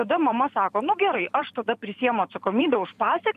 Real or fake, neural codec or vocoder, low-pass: fake; vocoder, 44.1 kHz, 128 mel bands every 512 samples, BigVGAN v2; 14.4 kHz